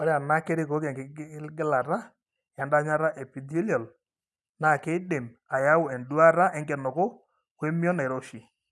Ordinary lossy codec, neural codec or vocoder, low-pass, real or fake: none; none; none; real